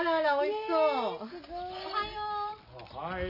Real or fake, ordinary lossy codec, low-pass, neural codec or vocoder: real; AAC, 48 kbps; 5.4 kHz; none